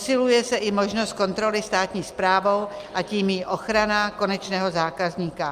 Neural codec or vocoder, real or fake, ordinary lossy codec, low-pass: none; real; Opus, 32 kbps; 14.4 kHz